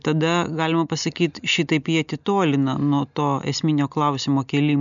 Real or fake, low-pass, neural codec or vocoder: real; 7.2 kHz; none